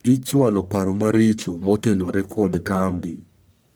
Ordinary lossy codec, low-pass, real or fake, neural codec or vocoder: none; none; fake; codec, 44.1 kHz, 1.7 kbps, Pupu-Codec